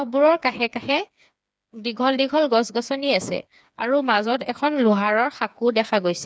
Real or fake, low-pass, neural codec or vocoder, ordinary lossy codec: fake; none; codec, 16 kHz, 4 kbps, FreqCodec, smaller model; none